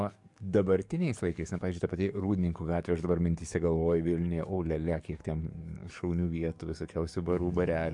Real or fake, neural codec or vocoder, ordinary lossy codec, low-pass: fake; codec, 44.1 kHz, 7.8 kbps, DAC; MP3, 64 kbps; 10.8 kHz